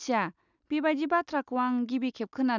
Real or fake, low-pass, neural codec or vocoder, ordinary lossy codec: real; 7.2 kHz; none; none